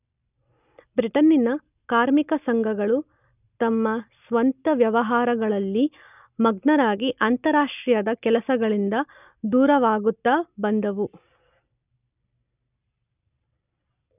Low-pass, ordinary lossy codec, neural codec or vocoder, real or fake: 3.6 kHz; none; none; real